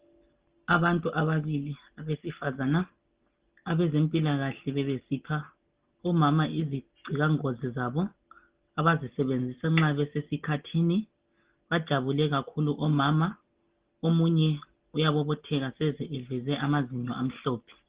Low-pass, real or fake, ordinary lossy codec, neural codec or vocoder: 3.6 kHz; real; Opus, 16 kbps; none